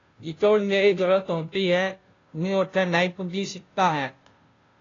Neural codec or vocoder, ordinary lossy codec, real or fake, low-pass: codec, 16 kHz, 0.5 kbps, FunCodec, trained on Chinese and English, 25 frames a second; AAC, 32 kbps; fake; 7.2 kHz